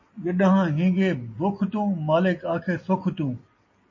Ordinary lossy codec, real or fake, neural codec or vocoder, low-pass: MP3, 32 kbps; real; none; 7.2 kHz